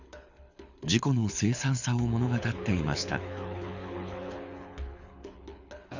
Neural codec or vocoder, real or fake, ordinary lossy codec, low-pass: codec, 24 kHz, 6 kbps, HILCodec; fake; none; 7.2 kHz